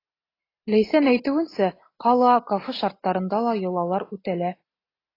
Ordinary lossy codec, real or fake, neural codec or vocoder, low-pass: AAC, 24 kbps; real; none; 5.4 kHz